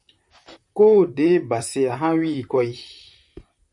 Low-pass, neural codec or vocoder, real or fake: 10.8 kHz; vocoder, 44.1 kHz, 128 mel bands, Pupu-Vocoder; fake